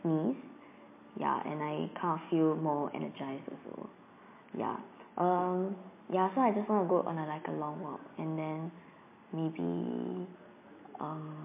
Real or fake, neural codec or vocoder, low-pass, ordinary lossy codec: real; none; 3.6 kHz; MP3, 24 kbps